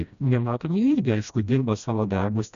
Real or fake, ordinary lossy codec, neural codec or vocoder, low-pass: fake; MP3, 96 kbps; codec, 16 kHz, 1 kbps, FreqCodec, smaller model; 7.2 kHz